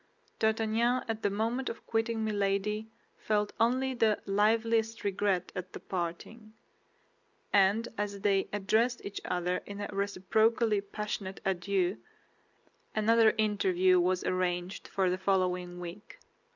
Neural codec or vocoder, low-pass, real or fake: none; 7.2 kHz; real